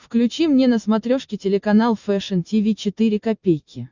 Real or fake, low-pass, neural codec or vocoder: real; 7.2 kHz; none